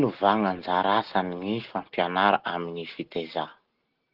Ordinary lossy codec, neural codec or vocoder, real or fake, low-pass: Opus, 16 kbps; none; real; 5.4 kHz